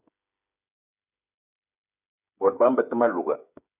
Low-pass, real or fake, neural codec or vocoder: 3.6 kHz; fake; codec, 16 kHz, 8 kbps, FreqCodec, smaller model